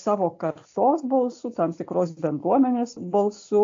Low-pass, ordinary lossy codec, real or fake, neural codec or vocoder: 7.2 kHz; AAC, 48 kbps; real; none